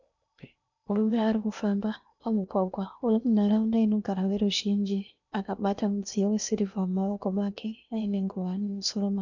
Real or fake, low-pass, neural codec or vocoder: fake; 7.2 kHz; codec, 16 kHz in and 24 kHz out, 0.8 kbps, FocalCodec, streaming, 65536 codes